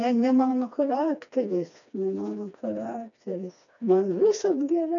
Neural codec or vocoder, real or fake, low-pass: codec, 16 kHz, 2 kbps, FreqCodec, smaller model; fake; 7.2 kHz